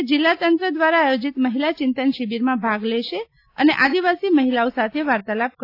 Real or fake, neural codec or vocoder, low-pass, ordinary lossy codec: real; none; 5.4 kHz; AAC, 32 kbps